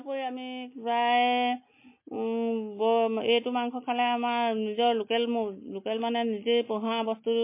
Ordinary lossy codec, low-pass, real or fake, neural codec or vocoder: MP3, 24 kbps; 3.6 kHz; real; none